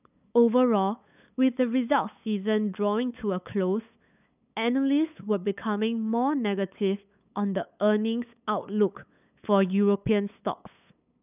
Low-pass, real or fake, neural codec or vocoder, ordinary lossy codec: 3.6 kHz; fake; codec, 16 kHz, 8 kbps, FunCodec, trained on LibriTTS, 25 frames a second; none